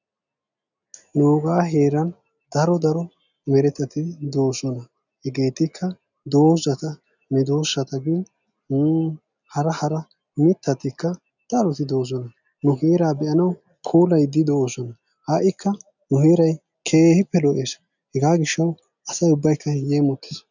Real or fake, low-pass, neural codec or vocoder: real; 7.2 kHz; none